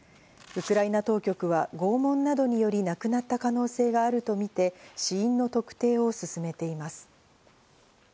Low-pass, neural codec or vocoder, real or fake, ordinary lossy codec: none; none; real; none